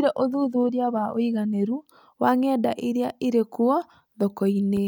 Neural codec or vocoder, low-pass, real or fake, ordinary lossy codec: none; none; real; none